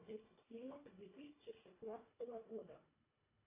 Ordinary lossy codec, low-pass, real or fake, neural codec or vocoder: AAC, 24 kbps; 3.6 kHz; fake; codec, 24 kHz, 1.5 kbps, HILCodec